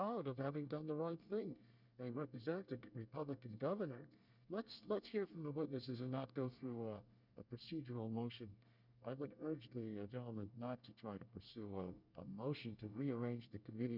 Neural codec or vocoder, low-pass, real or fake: codec, 24 kHz, 1 kbps, SNAC; 5.4 kHz; fake